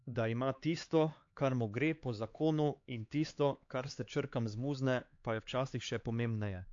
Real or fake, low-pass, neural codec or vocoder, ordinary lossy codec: fake; 7.2 kHz; codec, 16 kHz, 4 kbps, X-Codec, HuBERT features, trained on LibriSpeech; AAC, 48 kbps